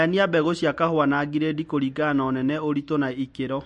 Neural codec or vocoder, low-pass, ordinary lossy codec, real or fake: none; 10.8 kHz; MP3, 48 kbps; real